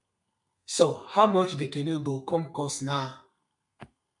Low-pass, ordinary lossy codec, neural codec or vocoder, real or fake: 10.8 kHz; MP3, 64 kbps; codec, 32 kHz, 1.9 kbps, SNAC; fake